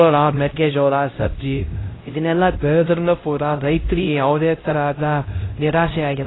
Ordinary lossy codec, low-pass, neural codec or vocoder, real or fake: AAC, 16 kbps; 7.2 kHz; codec, 16 kHz, 0.5 kbps, X-Codec, HuBERT features, trained on LibriSpeech; fake